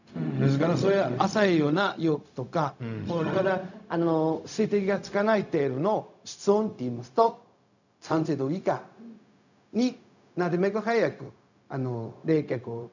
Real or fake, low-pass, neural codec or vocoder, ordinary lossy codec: fake; 7.2 kHz; codec, 16 kHz, 0.4 kbps, LongCat-Audio-Codec; none